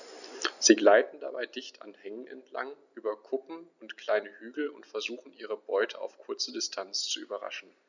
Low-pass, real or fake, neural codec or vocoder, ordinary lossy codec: 7.2 kHz; real; none; none